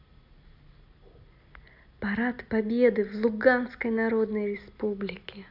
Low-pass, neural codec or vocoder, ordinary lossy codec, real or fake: 5.4 kHz; none; none; real